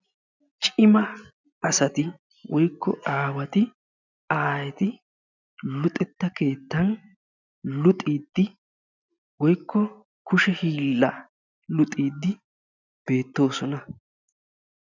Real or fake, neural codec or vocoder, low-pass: real; none; 7.2 kHz